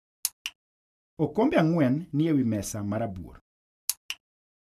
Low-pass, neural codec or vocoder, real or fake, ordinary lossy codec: 14.4 kHz; none; real; none